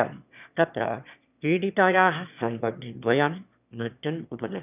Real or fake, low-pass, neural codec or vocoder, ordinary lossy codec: fake; 3.6 kHz; autoencoder, 22.05 kHz, a latent of 192 numbers a frame, VITS, trained on one speaker; none